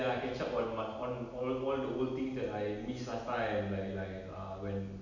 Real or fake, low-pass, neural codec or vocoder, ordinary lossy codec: real; 7.2 kHz; none; none